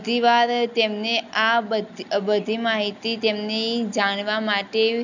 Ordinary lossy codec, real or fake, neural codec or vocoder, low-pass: none; real; none; 7.2 kHz